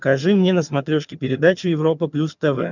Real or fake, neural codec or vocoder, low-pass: fake; vocoder, 22.05 kHz, 80 mel bands, HiFi-GAN; 7.2 kHz